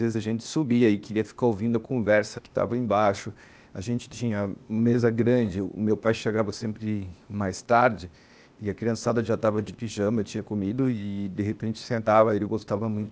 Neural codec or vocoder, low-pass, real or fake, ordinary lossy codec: codec, 16 kHz, 0.8 kbps, ZipCodec; none; fake; none